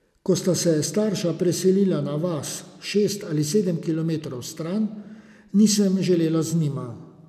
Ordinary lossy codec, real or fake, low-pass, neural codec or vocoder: none; real; 14.4 kHz; none